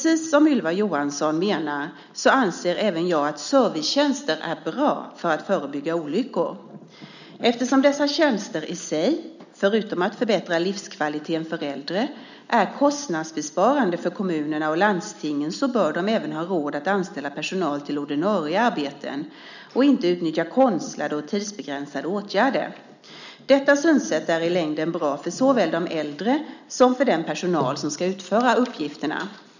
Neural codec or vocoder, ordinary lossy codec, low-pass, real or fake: none; none; 7.2 kHz; real